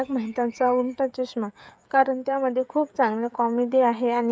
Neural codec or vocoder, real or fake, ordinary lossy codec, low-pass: codec, 16 kHz, 16 kbps, FreqCodec, smaller model; fake; none; none